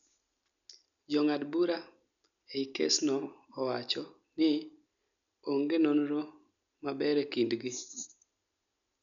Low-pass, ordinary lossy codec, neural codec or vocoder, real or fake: 7.2 kHz; none; none; real